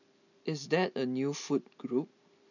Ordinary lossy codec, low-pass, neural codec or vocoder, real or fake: none; 7.2 kHz; none; real